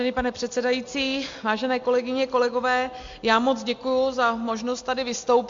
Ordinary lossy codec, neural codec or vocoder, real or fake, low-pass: MP3, 48 kbps; none; real; 7.2 kHz